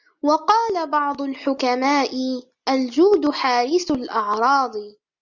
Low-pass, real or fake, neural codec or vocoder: 7.2 kHz; real; none